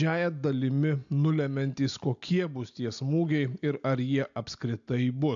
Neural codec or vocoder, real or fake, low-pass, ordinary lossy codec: none; real; 7.2 kHz; AAC, 64 kbps